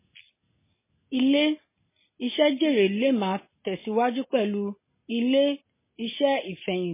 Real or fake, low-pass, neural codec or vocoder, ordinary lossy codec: real; 3.6 kHz; none; MP3, 16 kbps